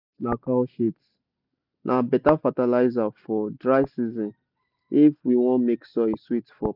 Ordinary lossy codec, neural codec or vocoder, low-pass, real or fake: none; none; 5.4 kHz; real